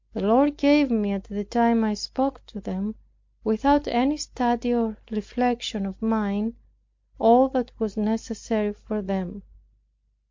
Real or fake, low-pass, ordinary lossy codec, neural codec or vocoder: real; 7.2 kHz; MP3, 48 kbps; none